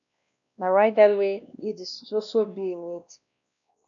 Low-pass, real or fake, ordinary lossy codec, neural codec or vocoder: 7.2 kHz; fake; AAC, 64 kbps; codec, 16 kHz, 1 kbps, X-Codec, WavLM features, trained on Multilingual LibriSpeech